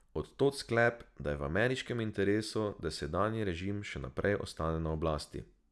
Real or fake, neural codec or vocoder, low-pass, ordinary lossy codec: real; none; none; none